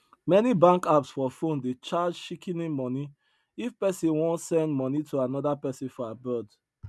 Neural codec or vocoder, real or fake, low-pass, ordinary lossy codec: none; real; none; none